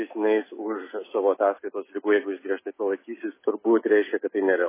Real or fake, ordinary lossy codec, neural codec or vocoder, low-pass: real; MP3, 16 kbps; none; 3.6 kHz